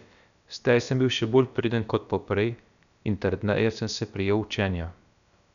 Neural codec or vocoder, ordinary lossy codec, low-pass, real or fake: codec, 16 kHz, about 1 kbps, DyCAST, with the encoder's durations; none; 7.2 kHz; fake